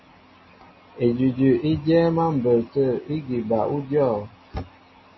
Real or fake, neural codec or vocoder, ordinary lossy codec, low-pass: real; none; MP3, 24 kbps; 7.2 kHz